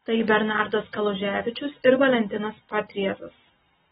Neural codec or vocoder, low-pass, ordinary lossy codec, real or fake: none; 7.2 kHz; AAC, 16 kbps; real